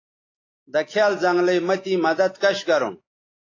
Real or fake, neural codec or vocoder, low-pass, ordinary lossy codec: real; none; 7.2 kHz; AAC, 32 kbps